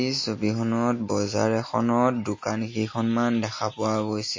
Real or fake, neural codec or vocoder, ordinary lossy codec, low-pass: real; none; MP3, 32 kbps; 7.2 kHz